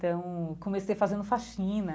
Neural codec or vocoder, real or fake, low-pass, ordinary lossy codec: none; real; none; none